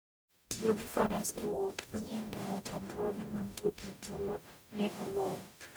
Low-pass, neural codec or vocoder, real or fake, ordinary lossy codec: none; codec, 44.1 kHz, 0.9 kbps, DAC; fake; none